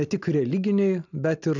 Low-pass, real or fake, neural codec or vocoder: 7.2 kHz; real; none